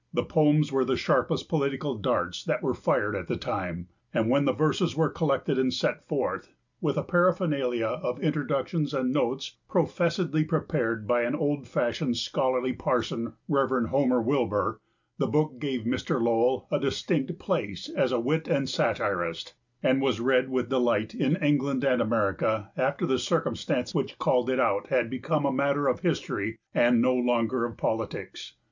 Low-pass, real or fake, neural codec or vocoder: 7.2 kHz; real; none